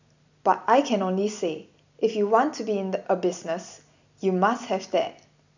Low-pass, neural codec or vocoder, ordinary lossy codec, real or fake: 7.2 kHz; none; none; real